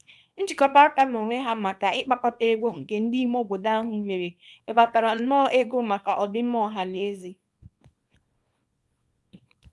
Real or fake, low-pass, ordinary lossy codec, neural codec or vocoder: fake; none; none; codec, 24 kHz, 0.9 kbps, WavTokenizer, small release